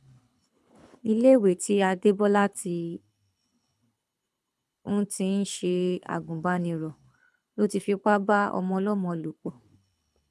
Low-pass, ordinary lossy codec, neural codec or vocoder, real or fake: none; none; codec, 24 kHz, 6 kbps, HILCodec; fake